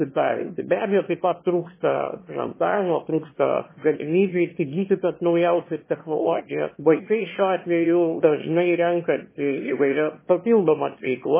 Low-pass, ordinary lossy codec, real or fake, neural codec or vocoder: 3.6 kHz; MP3, 16 kbps; fake; autoencoder, 22.05 kHz, a latent of 192 numbers a frame, VITS, trained on one speaker